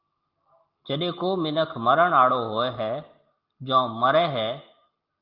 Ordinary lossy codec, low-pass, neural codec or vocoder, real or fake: Opus, 32 kbps; 5.4 kHz; none; real